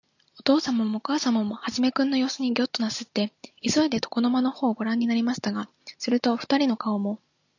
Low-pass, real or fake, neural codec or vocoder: 7.2 kHz; real; none